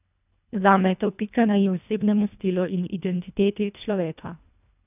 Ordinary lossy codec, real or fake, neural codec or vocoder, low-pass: none; fake; codec, 24 kHz, 1.5 kbps, HILCodec; 3.6 kHz